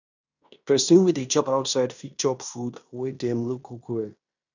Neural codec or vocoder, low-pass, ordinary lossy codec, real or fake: codec, 16 kHz in and 24 kHz out, 0.9 kbps, LongCat-Audio-Codec, fine tuned four codebook decoder; 7.2 kHz; none; fake